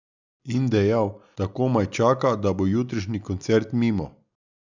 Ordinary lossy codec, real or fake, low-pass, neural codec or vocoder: none; real; 7.2 kHz; none